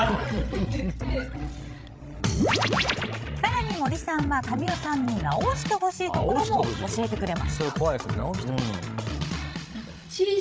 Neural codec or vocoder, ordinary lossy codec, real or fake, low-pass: codec, 16 kHz, 16 kbps, FreqCodec, larger model; none; fake; none